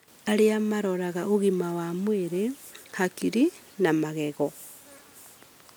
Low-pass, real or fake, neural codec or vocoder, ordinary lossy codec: none; real; none; none